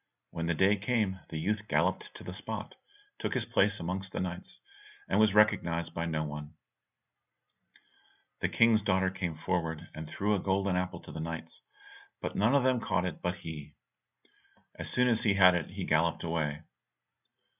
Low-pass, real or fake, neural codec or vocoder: 3.6 kHz; real; none